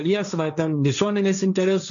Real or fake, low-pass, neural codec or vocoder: fake; 7.2 kHz; codec, 16 kHz, 1.1 kbps, Voila-Tokenizer